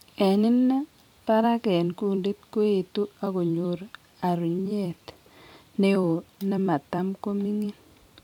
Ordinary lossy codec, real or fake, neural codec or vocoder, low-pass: none; fake; vocoder, 44.1 kHz, 128 mel bands every 256 samples, BigVGAN v2; 19.8 kHz